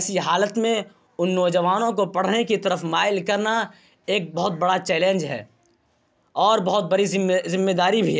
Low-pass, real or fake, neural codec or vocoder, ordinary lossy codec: none; real; none; none